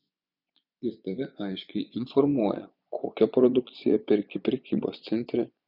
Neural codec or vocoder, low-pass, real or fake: vocoder, 24 kHz, 100 mel bands, Vocos; 5.4 kHz; fake